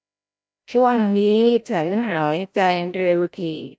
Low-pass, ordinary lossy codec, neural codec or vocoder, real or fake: none; none; codec, 16 kHz, 0.5 kbps, FreqCodec, larger model; fake